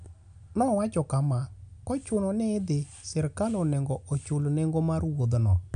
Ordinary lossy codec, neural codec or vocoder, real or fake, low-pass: none; none; real; 9.9 kHz